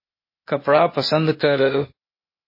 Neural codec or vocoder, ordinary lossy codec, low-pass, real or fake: codec, 16 kHz, 0.8 kbps, ZipCodec; MP3, 24 kbps; 5.4 kHz; fake